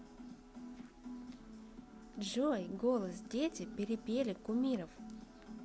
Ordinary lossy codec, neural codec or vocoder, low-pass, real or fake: none; none; none; real